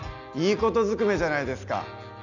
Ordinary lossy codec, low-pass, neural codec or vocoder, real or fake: none; 7.2 kHz; none; real